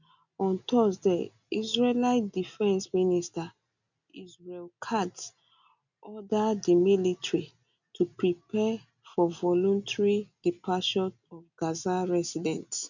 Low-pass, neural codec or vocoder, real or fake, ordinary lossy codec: 7.2 kHz; none; real; none